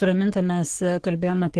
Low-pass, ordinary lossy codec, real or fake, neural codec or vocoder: 10.8 kHz; Opus, 16 kbps; fake; codec, 44.1 kHz, 3.4 kbps, Pupu-Codec